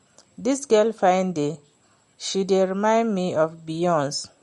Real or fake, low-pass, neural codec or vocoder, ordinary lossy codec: real; 19.8 kHz; none; MP3, 48 kbps